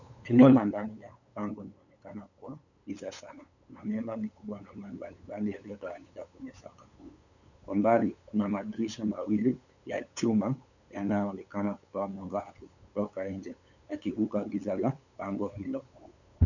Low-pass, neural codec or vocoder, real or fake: 7.2 kHz; codec, 16 kHz, 8 kbps, FunCodec, trained on LibriTTS, 25 frames a second; fake